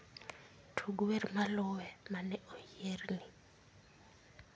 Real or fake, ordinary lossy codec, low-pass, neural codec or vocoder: real; none; none; none